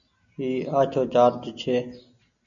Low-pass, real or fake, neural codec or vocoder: 7.2 kHz; real; none